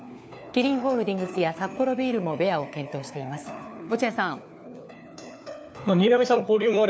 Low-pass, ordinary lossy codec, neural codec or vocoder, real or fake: none; none; codec, 16 kHz, 4 kbps, FunCodec, trained on LibriTTS, 50 frames a second; fake